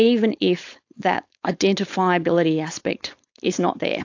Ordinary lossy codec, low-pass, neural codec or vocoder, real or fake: AAC, 48 kbps; 7.2 kHz; codec, 16 kHz, 4.8 kbps, FACodec; fake